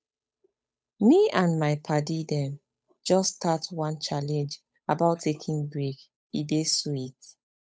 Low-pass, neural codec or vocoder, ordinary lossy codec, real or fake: none; codec, 16 kHz, 8 kbps, FunCodec, trained on Chinese and English, 25 frames a second; none; fake